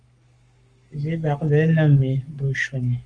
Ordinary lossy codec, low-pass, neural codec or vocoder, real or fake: Opus, 32 kbps; 9.9 kHz; codec, 44.1 kHz, 7.8 kbps, Pupu-Codec; fake